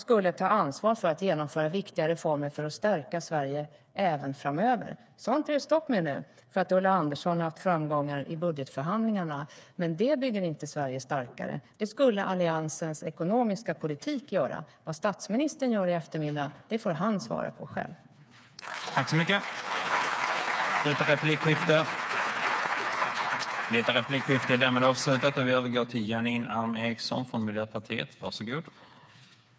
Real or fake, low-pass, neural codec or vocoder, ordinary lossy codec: fake; none; codec, 16 kHz, 4 kbps, FreqCodec, smaller model; none